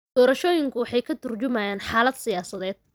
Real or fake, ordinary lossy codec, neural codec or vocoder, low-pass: fake; none; vocoder, 44.1 kHz, 128 mel bands every 256 samples, BigVGAN v2; none